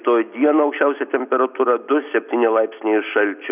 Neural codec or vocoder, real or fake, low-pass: none; real; 3.6 kHz